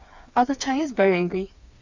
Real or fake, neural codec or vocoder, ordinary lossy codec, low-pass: fake; codec, 16 kHz, 4 kbps, FreqCodec, smaller model; Opus, 64 kbps; 7.2 kHz